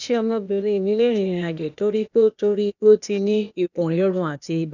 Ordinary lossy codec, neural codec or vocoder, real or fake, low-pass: none; codec, 16 kHz, 0.8 kbps, ZipCodec; fake; 7.2 kHz